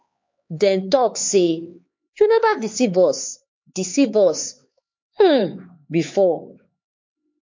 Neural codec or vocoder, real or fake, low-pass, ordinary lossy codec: codec, 16 kHz, 4 kbps, X-Codec, HuBERT features, trained on LibriSpeech; fake; 7.2 kHz; MP3, 48 kbps